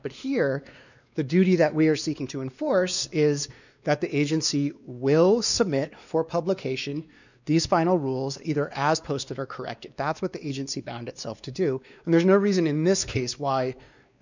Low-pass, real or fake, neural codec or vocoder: 7.2 kHz; fake; codec, 16 kHz, 2 kbps, X-Codec, WavLM features, trained on Multilingual LibriSpeech